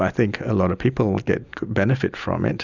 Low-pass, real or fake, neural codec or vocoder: 7.2 kHz; real; none